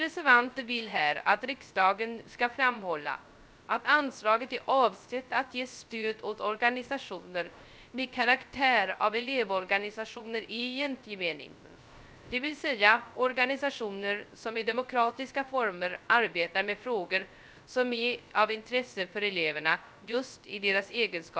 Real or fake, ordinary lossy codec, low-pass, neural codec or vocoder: fake; none; none; codec, 16 kHz, 0.3 kbps, FocalCodec